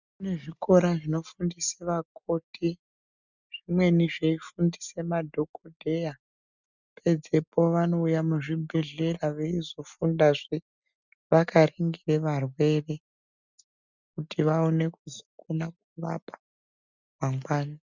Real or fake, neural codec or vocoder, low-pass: real; none; 7.2 kHz